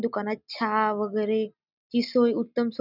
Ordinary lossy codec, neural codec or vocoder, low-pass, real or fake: none; none; 5.4 kHz; real